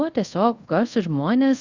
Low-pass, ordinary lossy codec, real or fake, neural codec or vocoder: 7.2 kHz; Opus, 64 kbps; fake; codec, 24 kHz, 0.5 kbps, DualCodec